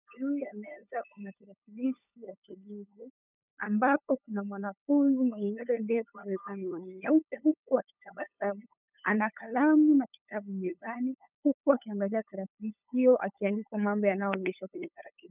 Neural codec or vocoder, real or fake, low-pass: codec, 16 kHz, 8 kbps, FunCodec, trained on LibriTTS, 25 frames a second; fake; 3.6 kHz